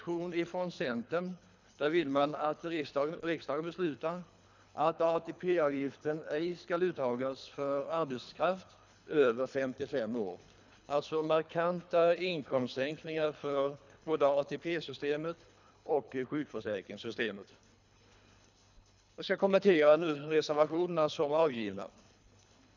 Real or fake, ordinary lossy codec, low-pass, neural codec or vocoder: fake; none; 7.2 kHz; codec, 24 kHz, 3 kbps, HILCodec